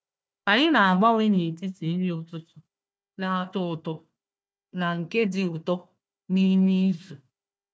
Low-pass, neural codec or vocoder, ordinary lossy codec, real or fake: none; codec, 16 kHz, 1 kbps, FunCodec, trained on Chinese and English, 50 frames a second; none; fake